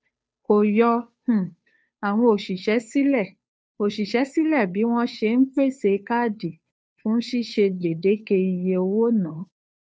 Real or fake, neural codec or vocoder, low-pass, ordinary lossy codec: fake; codec, 16 kHz, 2 kbps, FunCodec, trained on Chinese and English, 25 frames a second; none; none